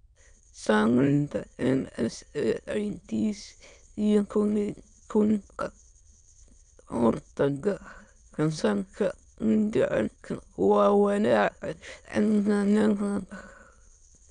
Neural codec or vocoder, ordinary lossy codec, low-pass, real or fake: autoencoder, 22.05 kHz, a latent of 192 numbers a frame, VITS, trained on many speakers; none; 9.9 kHz; fake